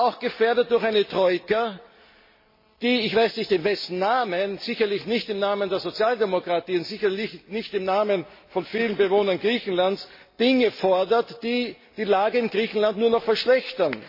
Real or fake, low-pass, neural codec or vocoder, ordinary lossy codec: real; 5.4 kHz; none; MP3, 24 kbps